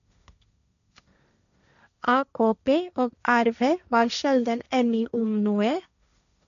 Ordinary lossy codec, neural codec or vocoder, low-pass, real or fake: none; codec, 16 kHz, 1.1 kbps, Voila-Tokenizer; 7.2 kHz; fake